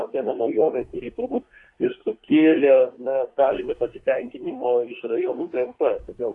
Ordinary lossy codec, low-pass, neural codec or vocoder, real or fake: AAC, 48 kbps; 10.8 kHz; codec, 24 kHz, 1 kbps, SNAC; fake